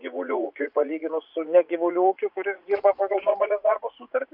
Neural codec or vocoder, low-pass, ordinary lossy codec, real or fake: vocoder, 44.1 kHz, 80 mel bands, Vocos; 5.4 kHz; MP3, 48 kbps; fake